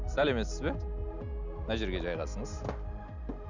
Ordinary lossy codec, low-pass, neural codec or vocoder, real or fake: Opus, 64 kbps; 7.2 kHz; none; real